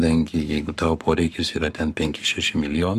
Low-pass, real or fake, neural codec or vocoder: 14.4 kHz; fake; codec, 44.1 kHz, 7.8 kbps, Pupu-Codec